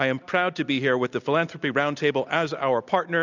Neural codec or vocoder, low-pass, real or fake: none; 7.2 kHz; real